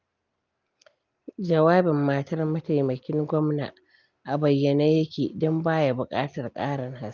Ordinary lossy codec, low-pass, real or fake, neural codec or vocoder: Opus, 24 kbps; 7.2 kHz; real; none